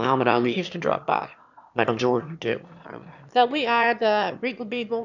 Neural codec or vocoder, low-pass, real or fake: autoencoder, 22.05 kHz, a latent of 192 numbers a frame, VITS, trained on one speaker; 7.2 kHz; fake